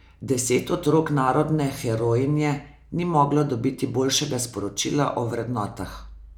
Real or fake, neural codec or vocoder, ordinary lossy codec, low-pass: real; none; none; 19.8 kHz